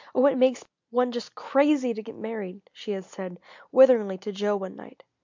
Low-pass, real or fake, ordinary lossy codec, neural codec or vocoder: 7.2 kHz; real; MP3, 64 kbps; none